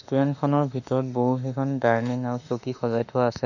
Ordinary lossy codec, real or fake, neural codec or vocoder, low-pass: none; fake; autoencoder, 48 kHz, 32 numbers a frame, DAC-VAE, trained on Japanese speech; 7.2 kHz